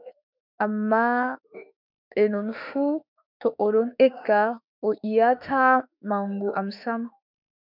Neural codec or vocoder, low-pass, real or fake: autoencoder, 48 kHz, 32 numbers a frame, DAC-VAE, trained on Japanese speech; 5.4 kHz; fake